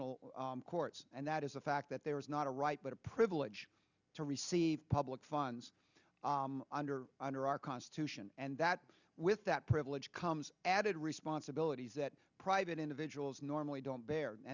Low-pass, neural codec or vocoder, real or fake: 7.2 kHz; none; real